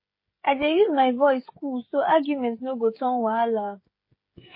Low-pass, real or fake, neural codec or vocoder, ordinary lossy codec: 5.4 kHz; fake; codec, 16 kHz, 16 kbps, FreqCodec, smaller model; MP3, 24 kbps